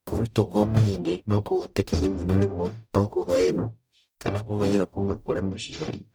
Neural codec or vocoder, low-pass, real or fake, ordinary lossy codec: codec, 44.1 kHz, 0.9 kbps, DAC; none; fake; none